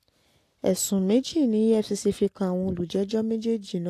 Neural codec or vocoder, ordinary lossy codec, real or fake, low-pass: none; AAC, 64 kbps; real; 14.4 kHz